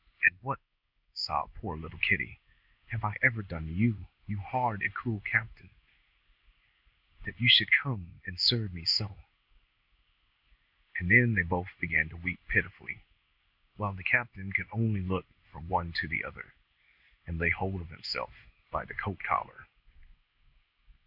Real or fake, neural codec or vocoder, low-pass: fake; codec, 16 kHz in and 24 kHz out, 1 kbps, XY-Tokenizer; 5.4 kHz